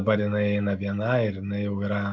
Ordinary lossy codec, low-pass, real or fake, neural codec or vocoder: AAC, 48 kbps; 7.2 kHz; real; none